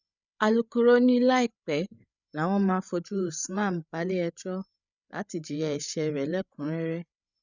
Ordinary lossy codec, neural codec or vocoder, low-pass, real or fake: none; codec, 16 kHz, 8 kbps, FreqCodec, larger model; 7.2 kHz; fake